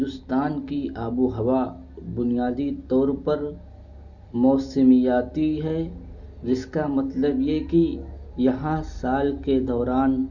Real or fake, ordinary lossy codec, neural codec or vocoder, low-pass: real; none; none; 7.2 kHz